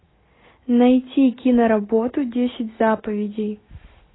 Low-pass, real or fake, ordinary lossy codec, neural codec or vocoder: 7.2 kHz; real; AAC, 16 kbps; none